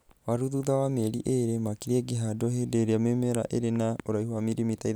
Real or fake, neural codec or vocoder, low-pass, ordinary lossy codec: real; none; none; none